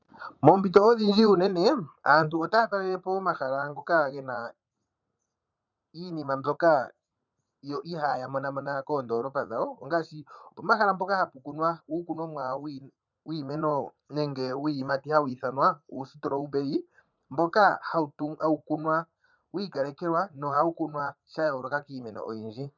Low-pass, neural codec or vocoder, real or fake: 7.2 kHz; vocoder, 44.1 kHz, 80 mel bands, Vocos; fake